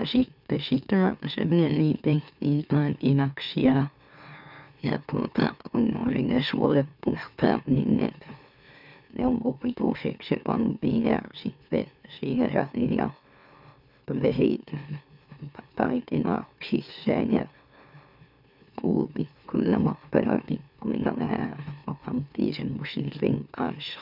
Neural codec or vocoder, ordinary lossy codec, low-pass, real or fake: autoencoder, 44.1 kHz, a latent of 192 numbers a frame, MeloTTS; none; 5.4 kHz; fake